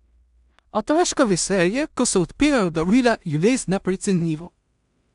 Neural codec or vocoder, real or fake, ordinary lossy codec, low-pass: codec, 16 kHz in and 24 kHz out, 0.4 kbps, LongCat-Audio-Codec, two codebook decoder; fake; none; 10.8 kHz